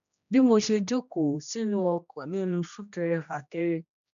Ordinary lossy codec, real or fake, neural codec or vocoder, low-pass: none; fake; codec, 16 kHz, 1 kbps, X-Codec, HuBERT features, trained on general audio; 7.2 kHz